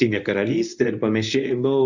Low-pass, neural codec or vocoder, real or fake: 7.2 kHz; codec, 24 kHz, 0.9 kbps, WavTokenizer, medium speech release version 1; fake